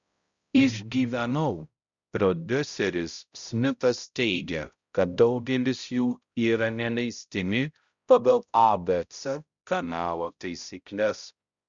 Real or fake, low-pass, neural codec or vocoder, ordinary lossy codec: fake; 7.2 kHz; codec, 16 kHz, 0.5 kbps, X-Codec, HuBERT features, trained on balanced general audio; Opus, 64 kbps